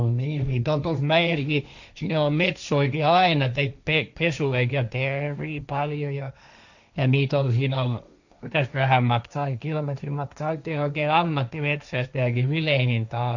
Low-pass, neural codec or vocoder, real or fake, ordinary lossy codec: 7.2 kHz; codec, 16 kHz, 1.1 kbps, Voila-Tokenizer; fake; none